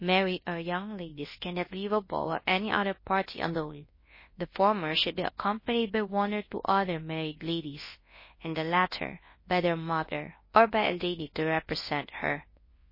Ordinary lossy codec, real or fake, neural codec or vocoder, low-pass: MP3, 24 kbps; fake; codec, 24 kHz, 0.9 kbps, WavTokenizer, large speech release; 5.4 kHz